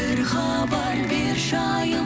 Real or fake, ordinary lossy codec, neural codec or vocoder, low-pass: real; none; none; none